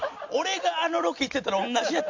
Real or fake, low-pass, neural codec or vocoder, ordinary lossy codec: real; 7.2 kHz; none; MP3, 48 kbps